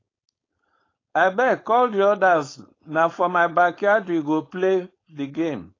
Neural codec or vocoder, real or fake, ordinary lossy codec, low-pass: codec, 16 kHz, 4.8 kbps, FACodec; fake; AAC, 32 kbps; 7.2 kHz